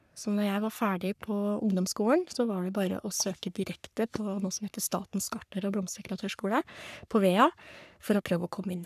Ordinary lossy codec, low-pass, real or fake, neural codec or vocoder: none; 14.4 kHz; fake; codec, 44.1 kHz, 3.4 kbps, Pupu-Codec